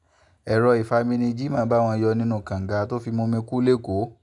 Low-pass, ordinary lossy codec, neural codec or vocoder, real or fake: 10.8 kHz; none; none; real